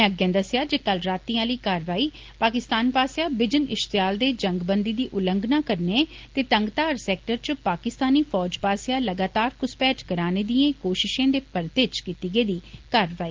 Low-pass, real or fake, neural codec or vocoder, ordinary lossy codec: 7.2 kHz; real; none; Opus, 16 kbps